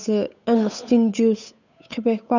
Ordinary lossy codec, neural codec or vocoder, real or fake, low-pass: none; codec, 16 kHz, 8 kbps, FunCodec, trained on Chinese and English, 25 frames a second; fake; 7.2 kHz